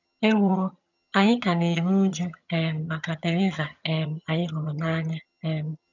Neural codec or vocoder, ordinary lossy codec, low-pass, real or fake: vocoder, 22.05 kHz, 80 mel bands, HiFi-GAN; none; 7.2 kHz; fake